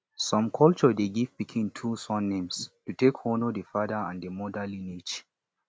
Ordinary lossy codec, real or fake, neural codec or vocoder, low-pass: none; real; none; none